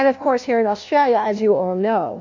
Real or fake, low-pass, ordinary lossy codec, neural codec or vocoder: fake; 7.2 kHz; AAC, 48 kbps; codec, 16 kHz, 1 kbps, FunCodec, trained on LibriTTS, 50 frames a second